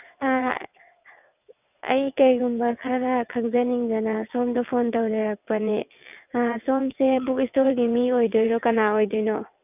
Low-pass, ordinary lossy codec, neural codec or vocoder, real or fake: 3.6 kHz; none; vocoder, 22.05 kHz, 80 mel bands, WaveNeXt; fake